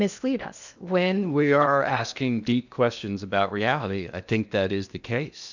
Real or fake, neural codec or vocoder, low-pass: fake; codec, 16 kHz in and 24 kHz out, 0.8 kbps, FocalCodec, streaming, 65536 codes; 7.2 kHz